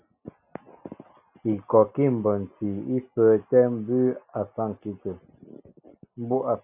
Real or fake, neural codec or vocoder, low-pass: real; none; 3.6 kHz